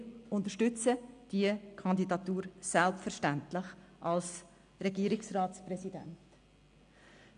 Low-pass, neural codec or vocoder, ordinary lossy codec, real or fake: 9.9 kHz; none; none; real